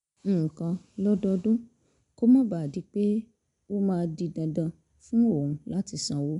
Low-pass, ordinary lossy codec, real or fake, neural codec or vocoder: 10.8 kHz; none; real; none